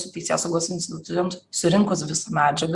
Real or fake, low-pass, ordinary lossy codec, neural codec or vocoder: real; 10.8 kHz; Opus, 64 kbps; none